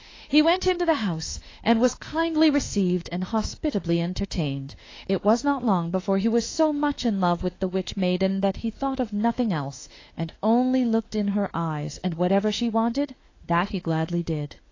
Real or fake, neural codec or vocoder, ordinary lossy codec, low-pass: fake; autoencoder, 48 kHz, 32 numbers a frame, DAC-VAE, trained on Japanese speech; AAC, 32 kbps; 7.2 kHz